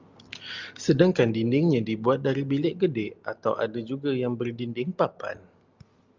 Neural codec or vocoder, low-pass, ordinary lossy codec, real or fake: none; 7.2 kHz; Opus, 32 kbps; real